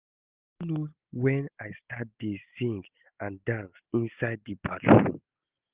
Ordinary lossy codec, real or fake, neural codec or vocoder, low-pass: Opus, 32 kbps; real; none; 3.6 kHz